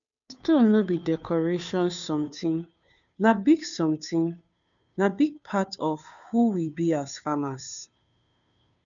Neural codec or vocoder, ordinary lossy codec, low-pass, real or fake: codec, 16 kHz, 2 kbps, FunCodec, trained on Chinese and English, 25 frames a second; none; 7.2 kHz; fake